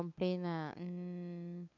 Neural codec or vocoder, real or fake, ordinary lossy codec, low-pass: codec, 16 kHz, 8 kbps, FunCodec, trained on LibriTTS, 25 frames a second; fake; none; 7.2 kHz